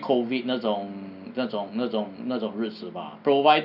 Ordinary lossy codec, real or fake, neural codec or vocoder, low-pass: none; real; none; 5.4 kHz